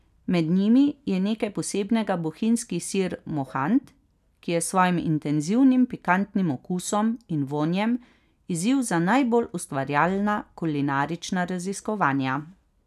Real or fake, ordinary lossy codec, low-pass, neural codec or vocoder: real; none; 14.4 kHz; none